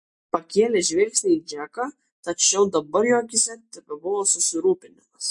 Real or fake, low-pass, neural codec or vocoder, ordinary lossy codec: real; 10.8 kHz; none; MP3, 48 kbps